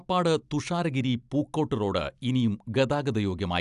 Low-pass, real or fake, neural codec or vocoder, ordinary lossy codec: 10.8 kHz; real; none; AAC, 96 kbps